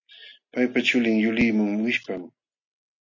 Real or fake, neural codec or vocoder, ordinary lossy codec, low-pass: real; none; MP3, 64 kbps; 7.2 kHz